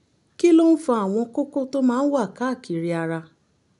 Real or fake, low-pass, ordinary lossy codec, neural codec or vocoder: real; 10.8 kHz; none; none